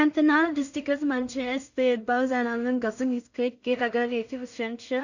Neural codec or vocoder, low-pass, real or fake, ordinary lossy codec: codec, 16 kHz in and 24 kHz out, 0.4 kbps, LongCat-Audio-Codec, two codebook decoder; 7.2 kHz; fake; none